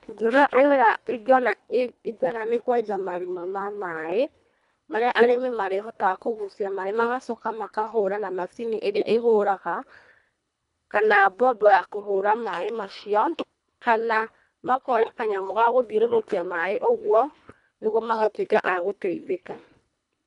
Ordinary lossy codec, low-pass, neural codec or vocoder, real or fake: none; 10.8 kHz; codec, 24 kHz, 1.5 kbps, HILCodec; fake